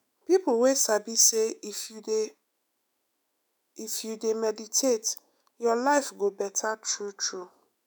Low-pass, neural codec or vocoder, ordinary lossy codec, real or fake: none; autoencoder, 48 kHz, 128 numbers a frame, DAC-VAE, trained on Japanese speech; none; fake